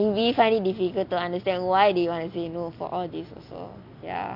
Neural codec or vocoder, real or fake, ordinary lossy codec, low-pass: none; real; AAC, 48 kbps; 5.4 kHz